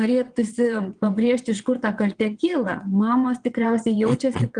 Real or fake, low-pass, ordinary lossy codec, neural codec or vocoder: fake; 9.9 kHz; Opus, 24 kbps; vocoder, 22.05 kHz, 80 mel bands, WaveNeXt